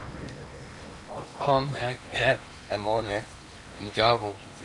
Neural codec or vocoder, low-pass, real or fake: codec, 16 kHz in and 24 kHz out, 0.8 kbps, FocalCodec, streaming, 65536 codes; 10.8 kHz; fake